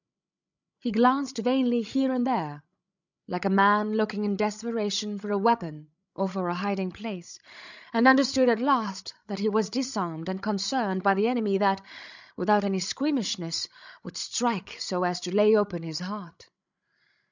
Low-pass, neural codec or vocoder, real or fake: 7.2 kHz; codec, 16 kHz, 16 kbps, FreqCodec, larger model; fake